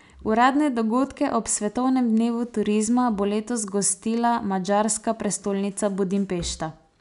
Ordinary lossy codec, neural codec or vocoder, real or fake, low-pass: none; none; real; 10.8 kHz